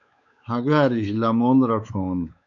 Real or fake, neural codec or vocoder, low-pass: fake; codec, 16 kHz, 4 kbps, X-Codec, WavLM features, trained on Multilingual LibriSpeech; 7.2 kHz